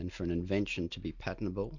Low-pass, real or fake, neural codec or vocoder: 7.2 kHz; real; none